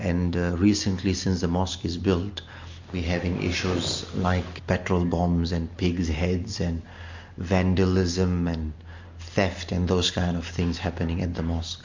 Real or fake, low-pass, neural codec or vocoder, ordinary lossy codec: real; 7.2 kHz; none; MP3, 48 kbps